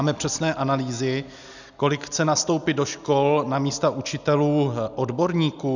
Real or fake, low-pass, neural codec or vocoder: real; 7.2 kHz; none